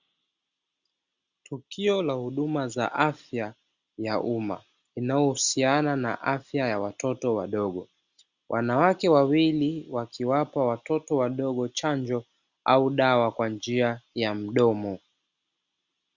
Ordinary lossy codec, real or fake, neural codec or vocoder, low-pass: Opus, 64 kbps; real; none; 7.2 kHz